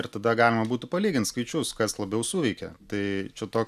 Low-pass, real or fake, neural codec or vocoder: 14.4 kHz; real; none